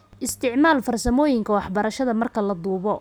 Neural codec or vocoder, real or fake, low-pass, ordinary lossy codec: none; real; none; none